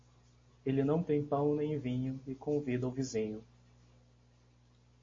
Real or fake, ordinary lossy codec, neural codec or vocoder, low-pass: real; MP3, 32 kbps; none; 7.2 kHz